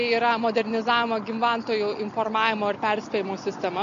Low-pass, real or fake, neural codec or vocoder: 7.2 kHz; real; none